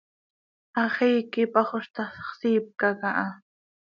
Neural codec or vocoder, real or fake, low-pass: none; real; 7.2 kHz